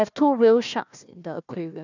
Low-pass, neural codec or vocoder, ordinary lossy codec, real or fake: 7.2 kHz; codec, 16 kHz, 1 kbps, FunCodec, trained on Chinese and English, 50 frames a second; none; fake